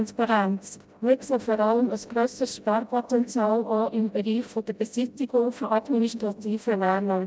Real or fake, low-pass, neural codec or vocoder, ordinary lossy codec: fake; none; codec, 16 kHz, 0.5 kbps, FreqCodec, smaller model; none